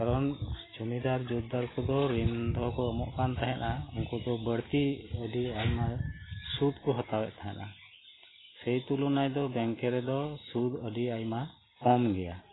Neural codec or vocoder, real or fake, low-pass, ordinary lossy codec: none; real; 7.2 kHz; AAC, 16 kbps